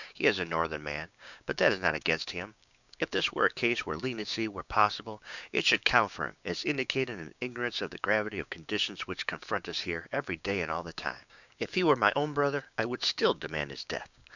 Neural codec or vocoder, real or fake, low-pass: codec, 16 kHz, 6 kbps, DAC; fake; 7.2 kHz